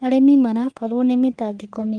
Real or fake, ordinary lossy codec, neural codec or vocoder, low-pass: fake; Opus, 24 kbps; codec, 44.1 kHz, 3.4 kbps, Pupu-Codec; 9.9 kHz